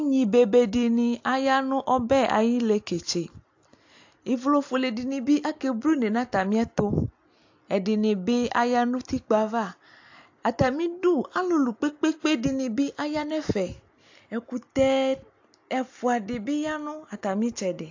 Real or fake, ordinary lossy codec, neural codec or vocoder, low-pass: real; AAC, 48 kbps; none; 7.2 kHz